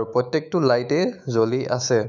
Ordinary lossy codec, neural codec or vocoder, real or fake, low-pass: none; autoencoder, 48 kHz, 128 numbers a frame, DAC-VAE, trained on Japanese speech; fake; 7.2 kHz